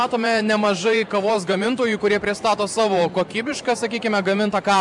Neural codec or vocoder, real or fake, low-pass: vocoder, 44.1 kHz, 128 mel bands every 512 samples, BigVGAN v2; fake; 10.8 kHz